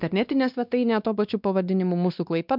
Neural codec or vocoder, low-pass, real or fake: codec, 16 kHz, 2 kbps, X-Codec, WavLM features, trained on Multilingual LibriSpeech; 5.4 kHz; fake